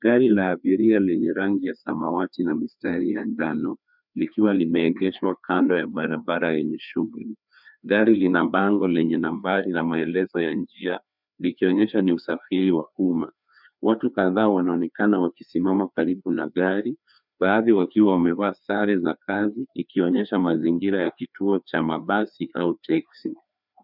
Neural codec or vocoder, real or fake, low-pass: codec, 16 kHz, 2 kbps, FreqCodec, larger model; fake; 5.4 kHz